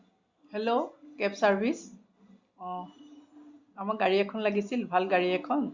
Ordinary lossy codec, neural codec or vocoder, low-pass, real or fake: Opus, 64 kbps; none; 7.2 kHz; real